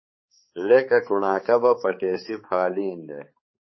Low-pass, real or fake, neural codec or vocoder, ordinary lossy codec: 7.2 kHz; fake; codec, 16 kHz, 4 kbps, X-Codec, HuBERT features, trained on balanced general audio; MP3, 24 kbps